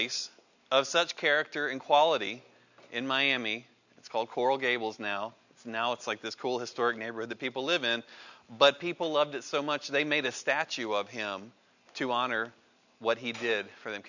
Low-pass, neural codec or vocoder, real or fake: 7.2 kHz; none; real